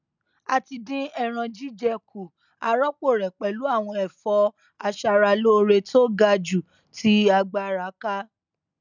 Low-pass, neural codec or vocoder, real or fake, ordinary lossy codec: 7.2 kHz; none; real; none